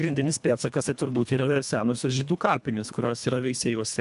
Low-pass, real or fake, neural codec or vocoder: 10.8 kHz; fake; codec, 24 kHz, 1.5 kbps, HILCodec